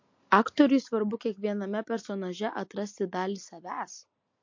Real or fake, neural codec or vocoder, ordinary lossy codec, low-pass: real; none; MP3, 48 kbps; 7.2 kHz